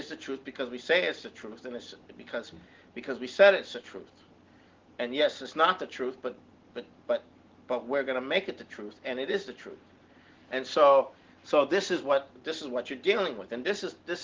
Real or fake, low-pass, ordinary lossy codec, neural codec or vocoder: real; 7.2 kHz; Opus, 16 kbps; none